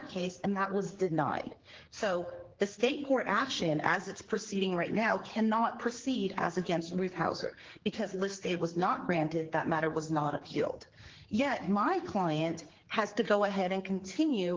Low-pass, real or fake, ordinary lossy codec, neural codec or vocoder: 7.2 kHz; fake; Opus, 16 kbps; codec, 16 kHz, 4 kbps, X-Codec, HuBERT features, trained on general audio